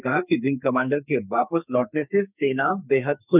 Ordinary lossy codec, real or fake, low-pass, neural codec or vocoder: none; fake; 3.6 kHz; codec, 44.1 kHz, 2.6 kbps, SNAC